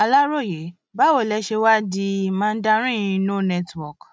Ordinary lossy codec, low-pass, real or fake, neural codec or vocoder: none; none; real; none